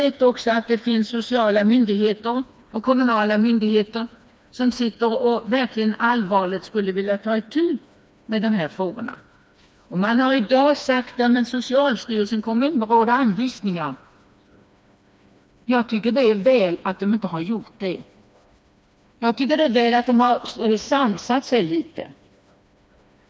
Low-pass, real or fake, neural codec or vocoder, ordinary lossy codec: none; fake; codec, 16 kHz, 2 kbps, FreqCodec, smaller model; none